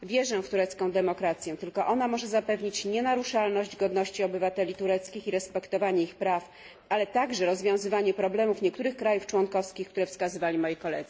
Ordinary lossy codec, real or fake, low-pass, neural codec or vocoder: none; real; none; none